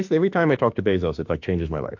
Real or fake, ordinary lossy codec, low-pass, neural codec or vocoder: fake; AAC, 48 kbps; 7.2 kHz; autoencoder, 48 kHz, 32 numbers a frame, DAC-VAE, trained on Japanese speech